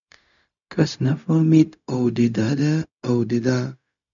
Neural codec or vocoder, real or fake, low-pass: codec, 16 kHz, 0.4 kbps, LongCat-Audio-Codec; fake; 7.2 kHz